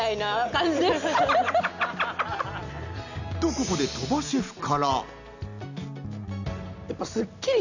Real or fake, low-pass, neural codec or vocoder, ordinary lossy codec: real; 7.2 kHz; none; none